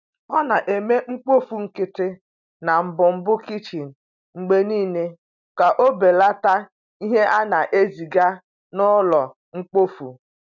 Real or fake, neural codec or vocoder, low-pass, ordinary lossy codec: real; none; 7.2 kHz; none